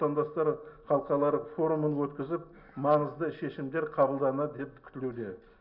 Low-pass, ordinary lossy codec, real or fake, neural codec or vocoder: 5.4 kHz; none; real; none